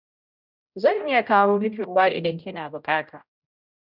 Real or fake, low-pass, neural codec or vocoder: fake; 5.4 kHz; codec, 16 kHz, 0.5 kbps, X-Codec, HuBERT features, trained on general audio